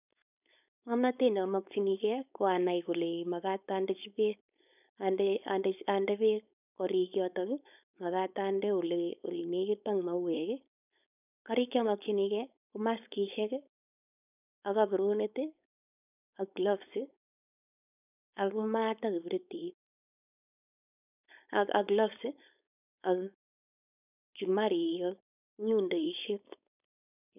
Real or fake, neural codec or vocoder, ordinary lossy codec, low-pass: fake; codec, 16 kHz, 4.8 kbps, FACodec; none; 3.6 kHz